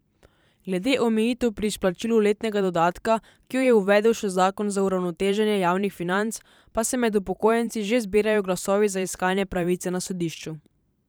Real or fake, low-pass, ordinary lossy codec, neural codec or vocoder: fake; none; none; vocoder, 44.1 kHz, 128 mel bands every 512 samples, BigVGAN v2